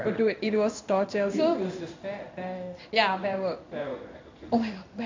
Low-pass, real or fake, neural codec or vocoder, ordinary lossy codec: 7.2 kHz; fake; codec, 16 kHz in and 24 kHz out, 1 kbps, XY-Tokenizer; MP3, 64 kbps